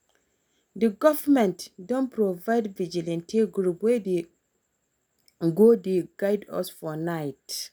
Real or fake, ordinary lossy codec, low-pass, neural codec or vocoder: real; none; none; none